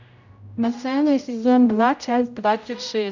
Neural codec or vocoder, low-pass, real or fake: codec, 16 kHz, 0.5 kbps, X-Codec, HuBERT features, trained on general audio; 7.2 kHz; fake